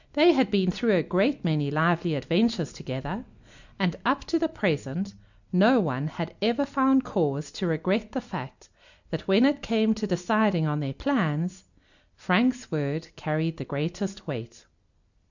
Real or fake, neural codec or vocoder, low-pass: real; none; 7.2 kHz